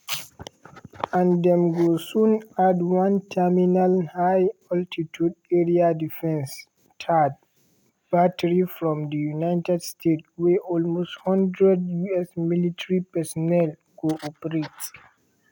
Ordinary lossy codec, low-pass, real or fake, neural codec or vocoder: none; 19.8 kHz; real; none